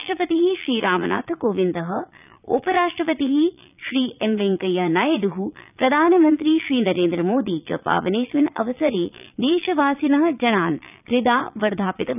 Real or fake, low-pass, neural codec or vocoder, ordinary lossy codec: fake; 3.6 kHz; vocoder, 22.05 kHz, 80 mel bands, Vocos; none